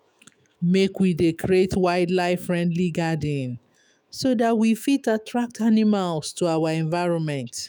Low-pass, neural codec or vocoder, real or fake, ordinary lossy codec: none; autoencoder, 48 kHz, 128 numbers a frame, DAC-VAE, trained on Japanese speech; fake; none